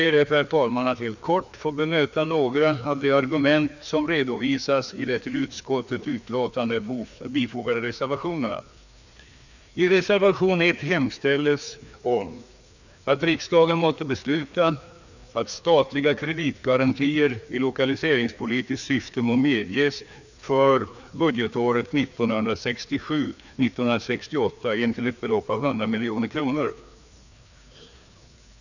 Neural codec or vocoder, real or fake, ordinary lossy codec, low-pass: codec, 16 kHz, 2 kbps, FreqCodec, larger model; fake; none; 7.2 kHz